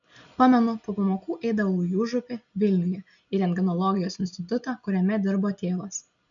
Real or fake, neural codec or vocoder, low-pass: real; none; 7.2 kHz